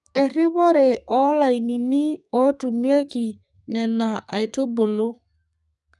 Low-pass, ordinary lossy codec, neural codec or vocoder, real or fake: 10.8 kHz; none; codec, 32 kHz, 1.9 kbps, SNAC; fake